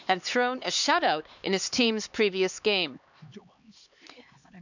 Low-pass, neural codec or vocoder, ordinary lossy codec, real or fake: 7.2 kHz; codec, 16 kHz, 2 kbps, X-Codec, HuBERT features, trained on LibriSpeech; none; fake